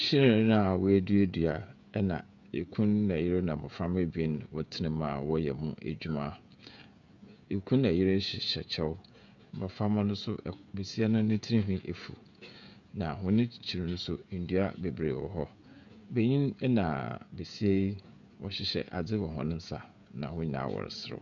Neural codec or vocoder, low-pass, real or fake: codec, 16 kHz, 16 kbps, FreqCodec, smaller model; 7.2 kHz; fake